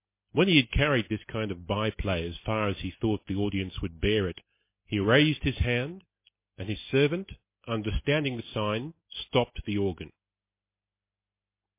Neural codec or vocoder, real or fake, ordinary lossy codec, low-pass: none; real; MP3, 24 kbps; 3.6 kHz